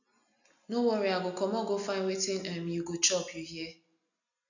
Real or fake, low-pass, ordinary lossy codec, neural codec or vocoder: real; 7.2 kHz; none; none